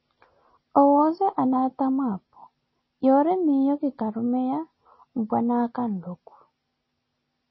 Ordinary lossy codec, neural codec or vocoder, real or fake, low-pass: MP3, 24 kbps; none; real; 7.2 kHz